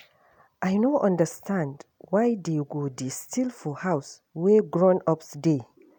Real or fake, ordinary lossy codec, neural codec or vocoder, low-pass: real; none; none; 19.8 kHz